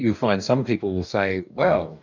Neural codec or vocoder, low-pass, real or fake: codec, 44.1 kHz, 2.6 kbps, DAC; 7.2 kHz; fake